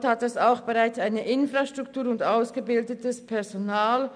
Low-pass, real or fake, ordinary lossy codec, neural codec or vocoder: 9.9 kHz; real; none; none